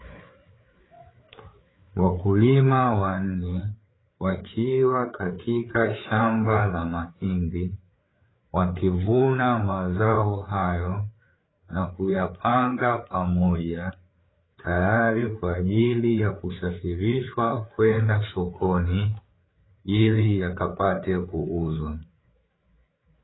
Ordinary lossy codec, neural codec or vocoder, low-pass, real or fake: AAC, 16 kbps; codec, 16 kHz, 4 kbps, FreqCodec, larger model; 7.2 kHz; fake